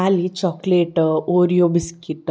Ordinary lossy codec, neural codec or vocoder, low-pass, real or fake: none; none; none; real